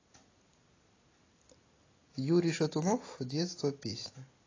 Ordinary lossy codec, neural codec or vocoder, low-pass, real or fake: AAC, 32 kbps; none; 7.2 kHz; real